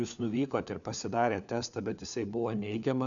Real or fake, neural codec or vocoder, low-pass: fake; codec, 16 kHz, 4 kbps, FunCodec, trained on LibriTTS, 50 frames a second; 7.2 kHz